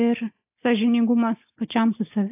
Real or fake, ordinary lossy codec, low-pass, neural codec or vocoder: real; AAC, 32 kbps; 3.6 kHz; none